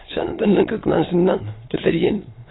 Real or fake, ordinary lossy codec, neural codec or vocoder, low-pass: fake; AAC, 16 kbps; autoencoder, 22.05 kHz, a latent of 192 numbers a frame, VITS, trained on many speakers; 7.2 kHz